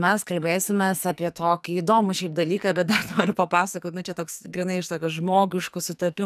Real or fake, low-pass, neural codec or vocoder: fake; 14.4 kHz; codec, 44.1 kHz, 2.6 kbps, SNAC